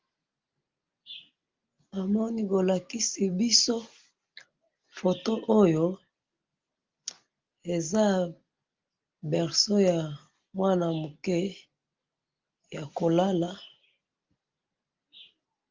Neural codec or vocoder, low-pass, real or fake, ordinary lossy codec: none; 7.2 kHz; real; Opus, 32 kbps